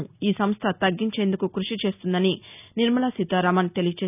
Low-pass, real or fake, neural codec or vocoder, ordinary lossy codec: 3.6 kHz; real; none; none